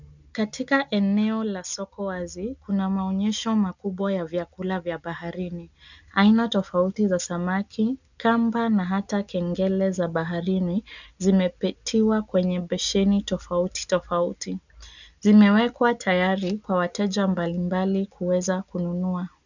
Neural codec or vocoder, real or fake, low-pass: none; real; 7.2 kHz